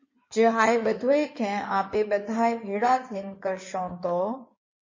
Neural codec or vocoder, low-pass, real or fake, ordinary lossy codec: codec, 16 kHz in and 24 kHz out, 2.2 kbps, FireRedTTS-2 codec; 7.2 kHz; fake; MP3, 32 kbps